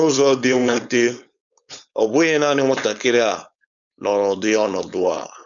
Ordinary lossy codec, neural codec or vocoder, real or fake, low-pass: none; codec, 16 kHz, 4.8 kbps, FACodec; fake; 7.2 kHz